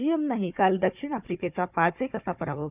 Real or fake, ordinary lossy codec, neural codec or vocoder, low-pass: fake; none; codec, 16 kHz, 4 kbps, FunCodec, trained on Chinese and English, 50 frames a second; 3.6 kHz